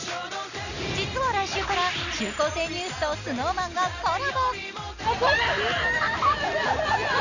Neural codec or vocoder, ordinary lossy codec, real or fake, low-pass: none; none; real; 7.2 kHz